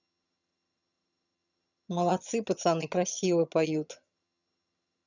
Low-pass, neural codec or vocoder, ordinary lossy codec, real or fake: 7.2 kHz; vocoder, 22.05 kHz, 80 mel bands, HiFi-GAN; none; fake